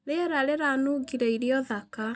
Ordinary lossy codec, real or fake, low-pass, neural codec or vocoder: none; real; none; none